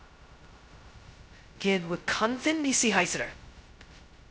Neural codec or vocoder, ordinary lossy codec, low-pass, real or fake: codec, 16 kHz, 0.2 kbps, FocalCodec; none; none; fake